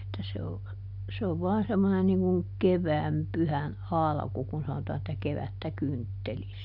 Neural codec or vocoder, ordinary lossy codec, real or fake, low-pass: none; none; real; 5.4 kHz